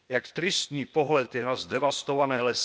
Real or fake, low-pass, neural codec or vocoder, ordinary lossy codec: fake; none; codec, 16 kHz, 0.8 kbps, ZipCodec; none